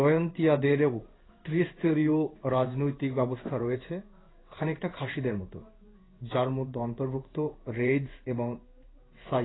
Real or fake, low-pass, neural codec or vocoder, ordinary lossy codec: fake; 7.2 kHz; codec, 16 kHz in and 24 kHz out, 1 kbps, XY-Tokenizer; AAC, 16 kbps